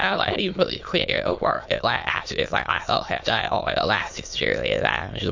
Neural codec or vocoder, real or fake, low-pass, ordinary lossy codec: autoencoder, 22.05 kHz, a latent of 192 numbers a frame, VITS, trained on many speakers; fake; 7.2 kHz; MP3, 48 kbps